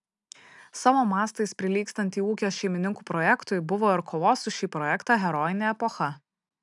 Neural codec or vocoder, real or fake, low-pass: none; real; 10.8 kHz